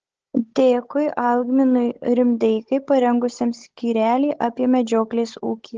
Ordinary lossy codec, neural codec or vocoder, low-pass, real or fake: Opus, 16 kbps; codec, 16 kHz, 16 kbps, FunCodec, trained on Chinese and English, 50 frames a second; 7.2 kHz; fake